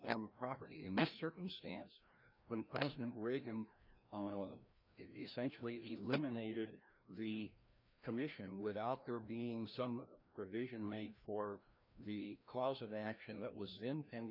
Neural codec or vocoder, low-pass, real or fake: codec, 16 kHz, 1 kbps, FreqCodec, larger model; 5.4 kHz; fake